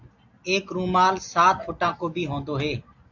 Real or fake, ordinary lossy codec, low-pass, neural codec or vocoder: real; AAC, 48 kbps; 7.2 kHz; none